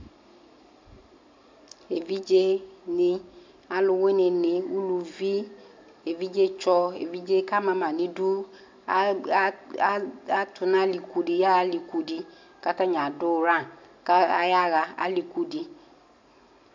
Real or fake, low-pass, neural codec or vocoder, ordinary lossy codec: real; 7.2 kHz; none; MP3, 64 kbps